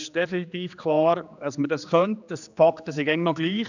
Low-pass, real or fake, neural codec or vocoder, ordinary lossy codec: 7.2 kHz; fake; codec, 16 kHz, 2 kbps, X-Codec, HuBERT features, trained on general audio; none